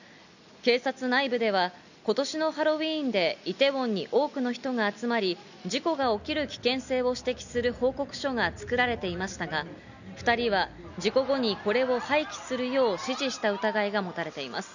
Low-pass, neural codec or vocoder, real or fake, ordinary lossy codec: 7.2 kHz; none; real; none